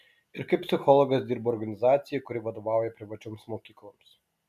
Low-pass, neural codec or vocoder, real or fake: 14.4 kHz; none; real